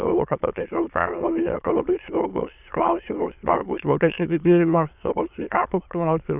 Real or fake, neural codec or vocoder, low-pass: fake; autoencoder, 22.05 kHz, a latent of 192 numbers a frame, VITS, trained on many speakers; 3.6 kHz